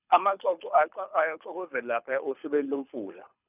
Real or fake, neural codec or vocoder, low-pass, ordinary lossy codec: fake; codec, 24 kHz, 6 kbps, HILCodec; 3.6 kHz; none